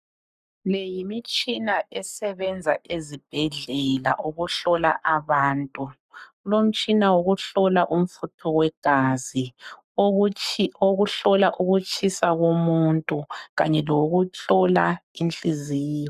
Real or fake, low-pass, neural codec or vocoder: fake; 14.4 kHz; codec, 44.1 kHz, 7.8 kbps, Pupu-Codec